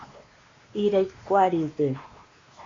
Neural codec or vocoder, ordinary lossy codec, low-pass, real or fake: codec, 16 kHz, 2 kbps, X-Codec, WavLM features, trained on Multilingual LibriSpeech; AAC, 32 kbps; 7.2 kHz; fake